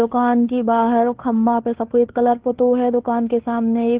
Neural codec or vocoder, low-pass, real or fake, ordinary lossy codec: codec, 16 kHz, 2 kbps, FunCodec, trained on Chinese and English, 25 frames a second; 3.6 kHz; fake; Opus, 16 kbps